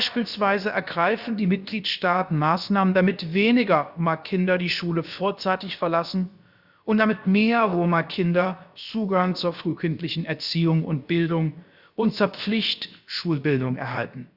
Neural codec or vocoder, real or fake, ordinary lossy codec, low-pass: codec, 16 kHz, about 1 kbps, DyCAST, with the encoder's durations; fake; Opus, 64 kbps; 5.4 kHz